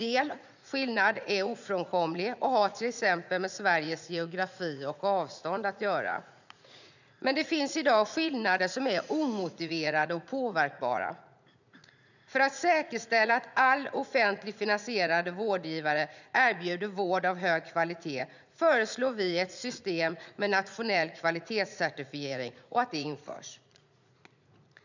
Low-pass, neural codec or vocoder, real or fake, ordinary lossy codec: 7.2 kHz; vocoder, 44.1 kHz, 80 mel bands, Vocos; fake; none